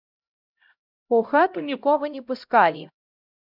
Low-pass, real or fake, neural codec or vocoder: 5.4 kHz; fake; codec, 16 kHz, 0.5 kbps, X-Codec, HuBERT features, trained on LibriSpeech